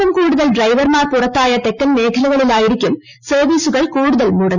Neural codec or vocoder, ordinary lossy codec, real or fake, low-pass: none; none; real; 7.2 kHz